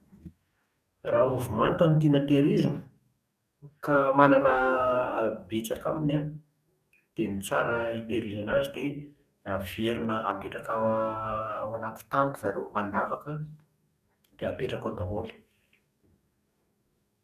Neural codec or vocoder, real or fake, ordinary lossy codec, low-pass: codec, 44.1 kHz, 2.6 kbps, DAC; fake; none; 14.4 kHz